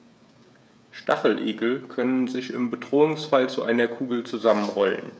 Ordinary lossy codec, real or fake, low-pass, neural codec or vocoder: none; fake; none; codec, 16 kHz, 16 kbps, FreqCodec, smaller model